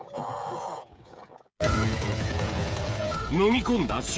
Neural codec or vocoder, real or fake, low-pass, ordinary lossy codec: codec, 16 kHz, 16 kbps, FreqCodec, smaller model; fake; none; none